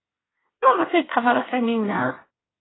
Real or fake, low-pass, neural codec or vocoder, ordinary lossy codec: fake; 7.2 kHz; codec, 24 kHz, 1 kbps, SNAC; AAC, 16 kbps